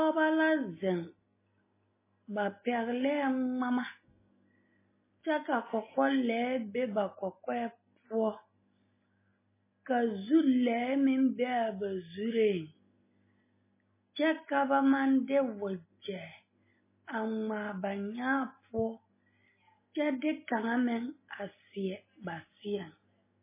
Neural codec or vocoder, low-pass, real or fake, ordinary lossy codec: none; 3.6 kHz; real; MP3, 16 kbps